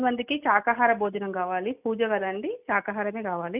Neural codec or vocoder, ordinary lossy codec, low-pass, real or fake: none; none; 3.6 kHz; real